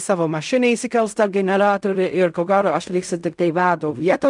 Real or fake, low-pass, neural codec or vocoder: fake; 10.8 kHz; codec, 16 kHz in and 24 kHz out, 0.4 kbps, LongCat-Audio-Codec, fine tuned four codebook decoder